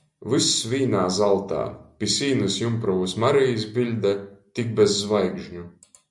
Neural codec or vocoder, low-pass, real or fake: none; 10.8 kHz; real